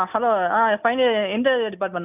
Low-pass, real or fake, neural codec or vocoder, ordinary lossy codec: 3.6 kHz; real; none; none